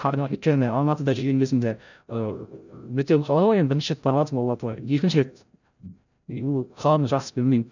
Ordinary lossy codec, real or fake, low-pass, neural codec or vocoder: none; fake; 7.2 kHz; codec, 16 kHz, 0.5 kbps, FreqCodec, larger model